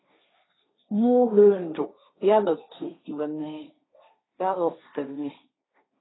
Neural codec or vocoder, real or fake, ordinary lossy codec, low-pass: codec, 16 kHz, 1.1 kbps, Voila-Tokenizer; fake; AAC, 16 kbps; 7.2 kHz